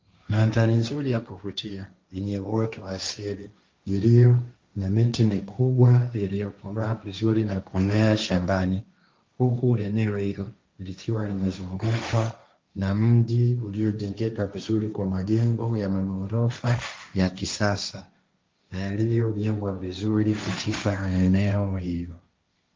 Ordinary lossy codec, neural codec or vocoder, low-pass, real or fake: Opus, 32 kbps; codec, 16 kHz, 1.1 kbps, Voila-Tokenizer; 7.2 kHz; fake